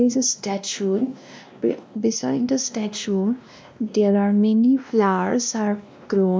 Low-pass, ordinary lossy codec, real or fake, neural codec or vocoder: none; none; fake; codec, 16 kHz, 1 kbps, X-Codec, WavLM features, trained on Multilingual LibriSpeech